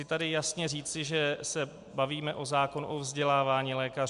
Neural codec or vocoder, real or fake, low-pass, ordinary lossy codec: none; real; 10.8 kHz; MP3, 64 kbps